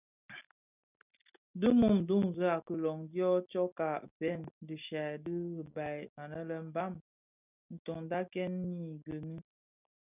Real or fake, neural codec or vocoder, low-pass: real; none; 3.6 kHz